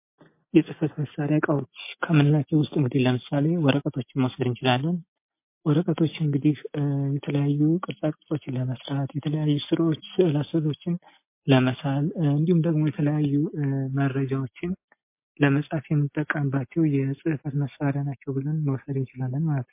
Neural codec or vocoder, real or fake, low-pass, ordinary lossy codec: none; real; 3.6 kHz; MP3, 24 kbps